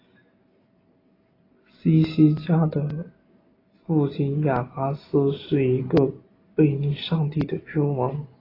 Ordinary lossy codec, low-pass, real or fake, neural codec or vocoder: AAC, 24 kbps; 5.4 kHz; real; none